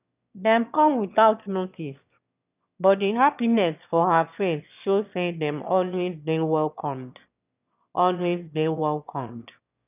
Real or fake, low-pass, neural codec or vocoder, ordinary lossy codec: fake; 3.6 kHz; autoencoder, 22.05 kHz, a latent of 192 numbers a frame, VITS, trained on one speaker; none